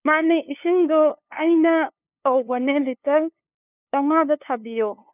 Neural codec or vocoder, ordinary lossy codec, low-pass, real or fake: codec, 16 kHz, 2 kbps, FunCodec, trained on LibriTTS, 25 frames a second; none; 3.6 kHz; fake